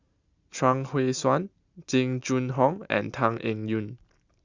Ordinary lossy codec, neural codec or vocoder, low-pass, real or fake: Opus, 64 kbps; none; 7.2 kHz; real